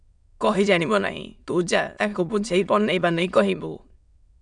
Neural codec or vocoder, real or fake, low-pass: autoencoder, 22.05 kHz, a latent of 192 numbers a frame, VITS, trained on many speakers; fake; 9.9 kHz